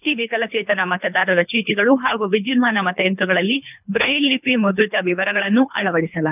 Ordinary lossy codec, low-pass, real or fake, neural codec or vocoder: none; 3.6 kHz; fake; codec, 24 kHz, 3 kbps, HILCodec